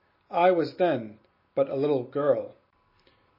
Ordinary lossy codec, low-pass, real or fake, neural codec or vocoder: MP3, 24 kbps; 5.4 kHz; real; none